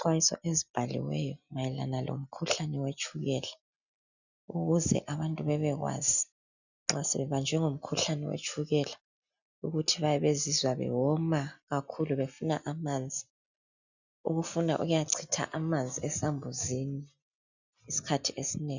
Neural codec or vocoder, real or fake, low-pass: none; real; 7.2 kHz